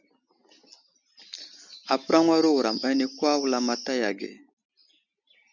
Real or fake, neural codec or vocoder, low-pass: real; none; 7.2 kHz